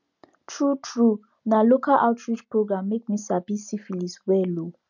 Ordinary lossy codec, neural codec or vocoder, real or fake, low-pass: none; none; real; 7.2 kHz